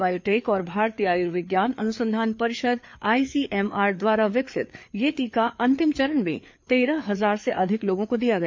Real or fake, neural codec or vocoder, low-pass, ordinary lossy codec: fake; codec, 16 kHz, 4 kbps, FreqCodec, larger model; 7.2 kHz; MP3, 48 kbps